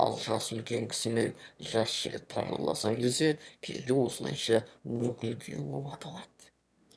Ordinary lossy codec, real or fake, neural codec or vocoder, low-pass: none; fake; autoencoder, 22.05 kHz, a latent of 192 numbers a frame, VITS, trained on one speaker; none